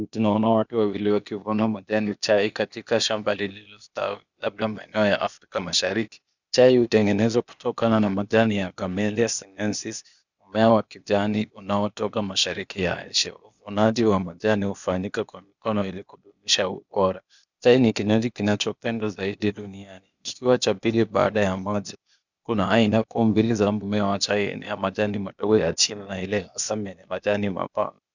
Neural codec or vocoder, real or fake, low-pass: codec, 16 kHz, 0.8 kbps, ZipCodec; fake; 7.2 kHz